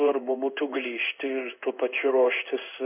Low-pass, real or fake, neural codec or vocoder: 3.6 kHz; fake; codec, 16 kHz in and 24 kHz out, 1 kbps, XY-Tokenizer